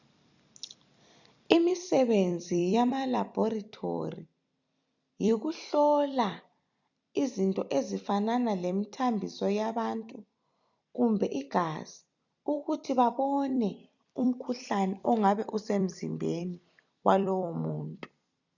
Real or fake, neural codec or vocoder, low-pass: fake; vocoder, 44.1 kHz, 128 mel bands every 256 samples, BigVGAN v2; 7.2 kHz